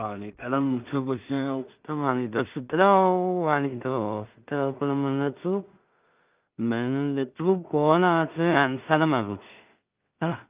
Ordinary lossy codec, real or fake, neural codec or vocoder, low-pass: Opus, 24 kbps; fake; codec, 16 kHz in and 24 kHz out, 0.4 kbps, LongCat-Audio-Codec, two codebook decoder; 3.6 kHz